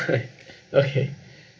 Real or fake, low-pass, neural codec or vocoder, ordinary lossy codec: real; none; none; none